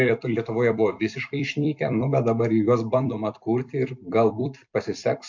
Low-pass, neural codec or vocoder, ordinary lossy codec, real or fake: 7.2 kHz; vocoder, 44.1 kHz, 128 mel bands every 256 samples, BigVGAN v2; MP3, 48 kbps; fake